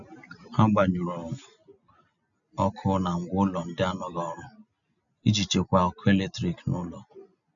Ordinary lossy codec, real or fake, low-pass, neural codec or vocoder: none; real; 7.2 kHz; none